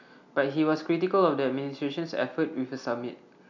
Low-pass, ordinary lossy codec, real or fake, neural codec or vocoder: 7.2 kHz; none; real; none